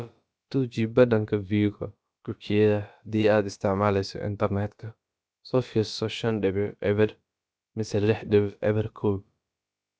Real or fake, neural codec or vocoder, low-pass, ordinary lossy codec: fake; codec, 16 kHz, about 1 kbps, DyCAST, with the encoder's durations; none; none